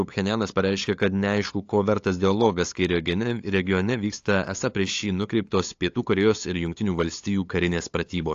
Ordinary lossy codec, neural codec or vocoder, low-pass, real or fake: AAC, 48 kbps; codec, 16 kHz, 8 kbps, FunCodec, trained on LibriTTS, 25 frames a second; 7.2 kHz; fake